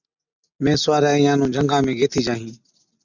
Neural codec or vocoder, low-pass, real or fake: none; 7.2 kHz; real